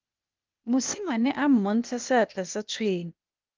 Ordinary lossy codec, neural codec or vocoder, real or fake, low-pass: Opus, 24 kbps; codec, 16 kHz, 0.8 kbps, ZipCodec; fake; 7.2 kHz